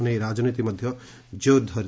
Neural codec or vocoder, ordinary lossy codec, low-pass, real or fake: none; none; none; real